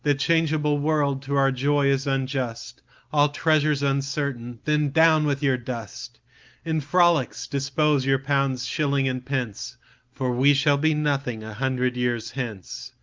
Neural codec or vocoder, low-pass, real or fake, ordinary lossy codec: none; 7.2 kHz; real; Opus, 24 kbps